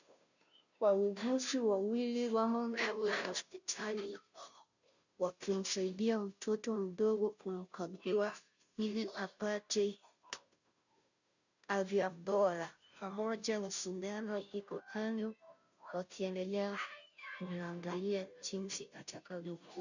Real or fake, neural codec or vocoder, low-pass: fake; codec, 16 kHz, 0.5 kbps, FunCodec, trained on Chinese and English, 25 frames a second; 7.2 kHz